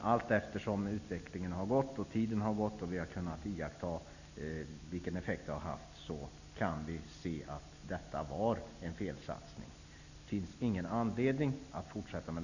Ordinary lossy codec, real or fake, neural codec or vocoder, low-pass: none; real; none; 7.2 kHz